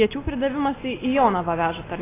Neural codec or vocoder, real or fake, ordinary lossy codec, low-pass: none; real; AAC, 16 kbps; 3.6 kHz